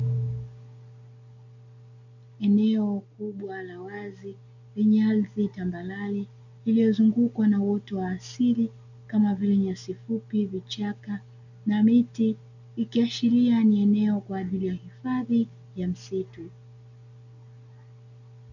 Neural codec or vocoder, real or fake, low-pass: none; real; 7.2 kHz